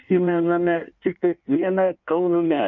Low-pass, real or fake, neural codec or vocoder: 7.2 kHz; fake; codec, 16 kHz in and 24 kHz out, 1.1 kbps, FireRedTTS-2 codec